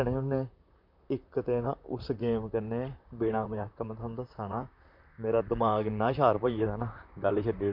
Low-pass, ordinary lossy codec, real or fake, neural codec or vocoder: 5.4 kHz; none; fake; vocoder, 44.1 kHz, 128 mel bands, Pupu-Vocoder